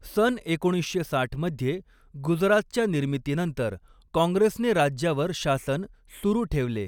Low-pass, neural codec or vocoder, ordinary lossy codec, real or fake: 19.8 kHz; none; none; real